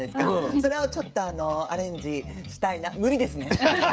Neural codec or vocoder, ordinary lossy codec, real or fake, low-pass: codec, 16 kHz, 16 kbps, FreqCodec, smaller model; none; fake; none